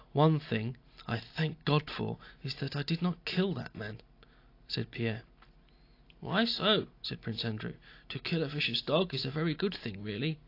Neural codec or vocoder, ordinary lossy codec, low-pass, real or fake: none; AAC, 32 kbps; 5.4 kHz; real